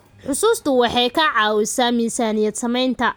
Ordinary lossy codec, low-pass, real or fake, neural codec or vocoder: none; none; real; none